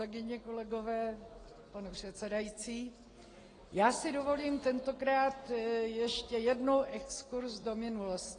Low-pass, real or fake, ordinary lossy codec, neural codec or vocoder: 9.9 kHz; real; AAC, 32 kbps; none